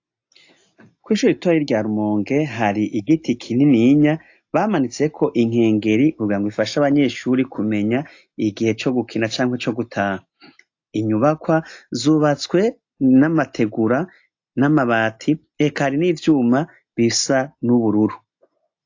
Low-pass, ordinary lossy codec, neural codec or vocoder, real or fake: 7.2 kHz; AAC, 48 kbps; none; real